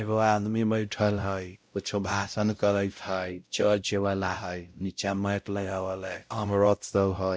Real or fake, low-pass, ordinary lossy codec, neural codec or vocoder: fake; none; none; codec, 16 kHz, 0.5 kbps, X-Codec, WavLM features, trained on Multilingual LibriSpeech